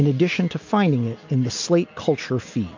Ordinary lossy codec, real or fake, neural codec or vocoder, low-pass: MP3, 48 kbps; real; none; 7.2 kHz